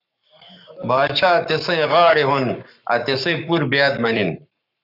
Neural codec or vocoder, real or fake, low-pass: codec, 44.1 kHz, 7.8 kbps, Pupu-Codec; fake; 5.4 kHz